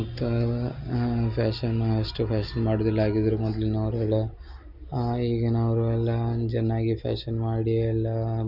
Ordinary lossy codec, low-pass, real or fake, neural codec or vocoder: none; 5.4 kHz; real; none